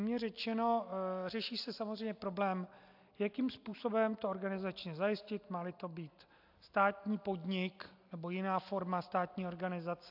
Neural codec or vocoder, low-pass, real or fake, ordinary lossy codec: none; 5.4 kHz; real; AAC, 48 kbps